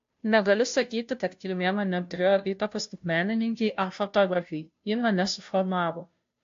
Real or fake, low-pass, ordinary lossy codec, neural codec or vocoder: fake; 7.2 kHz; AAC, 48 kbps; codec, 16 kHz, 0.5 kbps, FunCodec, trained on Chinese and English, 25 frames a second